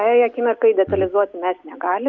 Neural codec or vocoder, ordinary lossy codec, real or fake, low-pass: none; MP3, 64 kbps; real; 7.2 kHz